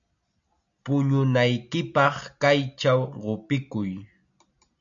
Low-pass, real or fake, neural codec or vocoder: 7.2 kHz; real; none